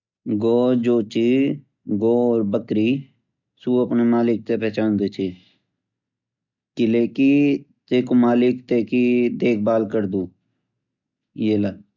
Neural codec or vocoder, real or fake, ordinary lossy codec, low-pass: none; real; none; 7.2 kHz